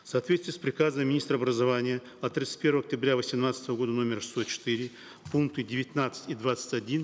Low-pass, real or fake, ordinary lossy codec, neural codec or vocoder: none; real; none; none